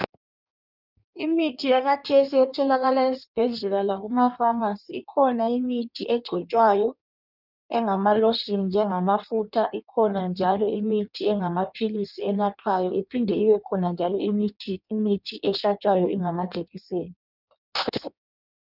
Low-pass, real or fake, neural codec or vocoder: 5.4 kHz; fake; codec, 16 kHz in and 24 kHz out, 1.1 kbps, FireRedTTS-2 codec